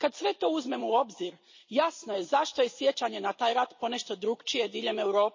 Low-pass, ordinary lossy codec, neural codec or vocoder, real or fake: 7.2 kHz; MP3, 32 kbps; vocoder, 44.1 kHz, 128 mel bands every 256 samples, BigVGAN v2; fake